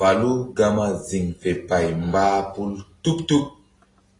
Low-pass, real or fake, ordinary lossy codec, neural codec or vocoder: 10.8 kHz; real; AAC, 32 kbps; none